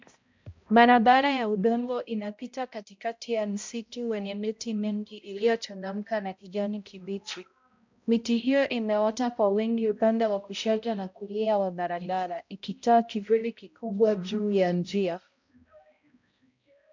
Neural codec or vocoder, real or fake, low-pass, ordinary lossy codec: codec, 16 kHz, 0.5 kbps, X-Codec, HuBERT features, trained on balanced general audio; fake; 7.2 kHz; MP3, 64 kbps